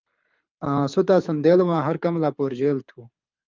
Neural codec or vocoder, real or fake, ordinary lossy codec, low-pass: codec, 24 kHz, 6 kbps, HILCodec; fake; Opus, 24 kbps; 7.2 kHz